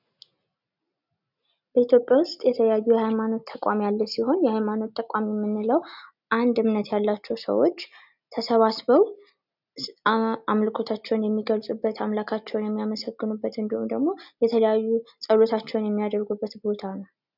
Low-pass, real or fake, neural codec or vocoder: 5.4 kHz; real; none